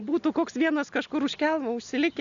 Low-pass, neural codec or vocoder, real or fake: 7.2 kHz; none; real